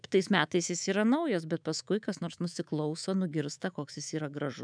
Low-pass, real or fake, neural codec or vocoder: 9.9 kHz; fake; codec, 24 kHz, 3.1 kbps, DualCodec